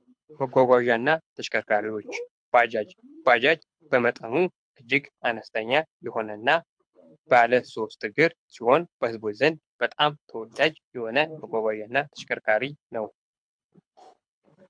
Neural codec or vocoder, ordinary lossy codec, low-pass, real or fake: codec, 24 kHz, 6 kbps, HILCodec; MP3, 64 kbps; 9.9 kHz; fake